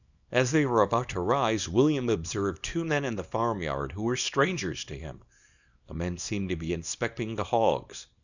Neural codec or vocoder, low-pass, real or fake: codec, 24 kHz, 0.9 kbps, WavTokenizer, small release; 7.2 kHz; fake